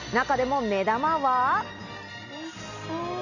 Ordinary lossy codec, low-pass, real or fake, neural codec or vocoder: none; 7.2 kHz; real; none